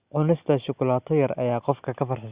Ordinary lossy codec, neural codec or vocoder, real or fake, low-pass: Opus, 64 kbps; none; real; 3.6 kHz